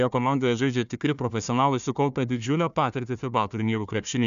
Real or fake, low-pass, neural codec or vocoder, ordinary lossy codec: fake; 7.2 kHz; codec, 16 kHz, 1 kbps, FunCodec, trained on Chinese and English, 50 frames a second; MP3, 96 kbps